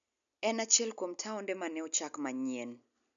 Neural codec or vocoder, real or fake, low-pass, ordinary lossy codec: none; real; 7.2 kHz; none